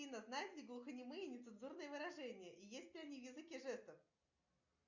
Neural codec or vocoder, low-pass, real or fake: none; 7.2 kHz; real